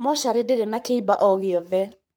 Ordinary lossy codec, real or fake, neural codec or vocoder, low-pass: none; fake; codec, 44.1 kHz, 3.4 kbps, Pupu-Codec; none